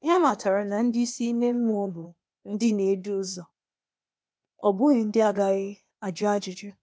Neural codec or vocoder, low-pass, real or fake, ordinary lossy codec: codec, 16 kHz, 0.8 kbps, ZipCodec; none; fake; none